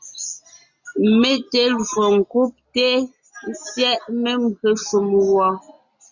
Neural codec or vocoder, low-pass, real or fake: none; 7.2 kHz; real